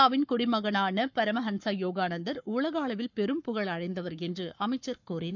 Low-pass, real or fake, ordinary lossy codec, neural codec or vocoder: 7.2 kHz; fake; none; codec, 44.1 kHz, 7.8 kbps, Pupu-Codec